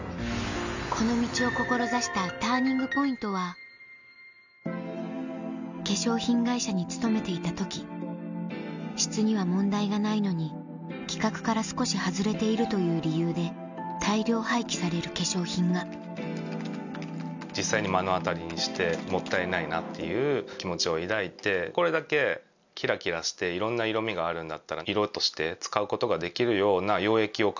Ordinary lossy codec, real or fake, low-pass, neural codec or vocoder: none; real; 7.2 kHz; none